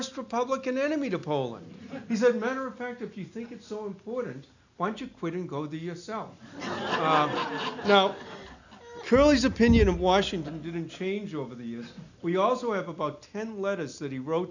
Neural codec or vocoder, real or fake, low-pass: none; real; 7.2 kHz